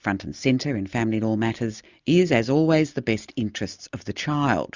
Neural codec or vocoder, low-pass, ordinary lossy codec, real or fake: none; 7.2 kHz; Opus, 64 kbps; real